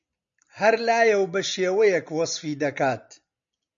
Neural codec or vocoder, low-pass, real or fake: none; 7.2 kHz; real